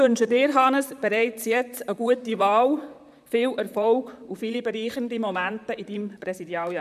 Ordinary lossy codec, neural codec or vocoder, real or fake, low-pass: none; vocoder, 44.1 kHz, 128 mel bands, Pupu-Vocoder; fake; 14.4 kHz